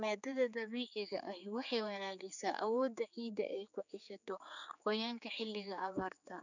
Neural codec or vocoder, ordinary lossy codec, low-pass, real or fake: codec, 16 kHz, 4 kbps, X-Codec, HuBERT features, trained on general audio; none; 7.2 kHz; fake